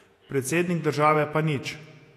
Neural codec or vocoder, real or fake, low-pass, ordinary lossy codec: vocoder, 48 kHz, 128 mel bands, Vocos; fake; 14.4 kHz; AAC, 64 kbps